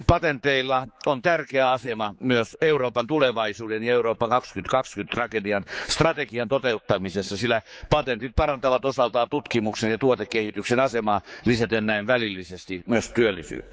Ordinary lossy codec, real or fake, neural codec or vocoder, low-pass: none; fake; codec, 16 kHz, 4 kbps, X-Codec, HuBERT features, trained on general audio; none